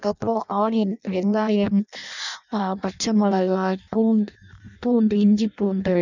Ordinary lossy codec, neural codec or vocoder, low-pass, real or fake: none; codec, 16 kHz in and 24 kHz out, 0.6 kbps, FireRedTTS-2 codec; 7.2 kHz; fake